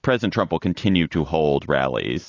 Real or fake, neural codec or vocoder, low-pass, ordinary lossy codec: real; none; 7.2 kHz; AAC, 32 kbps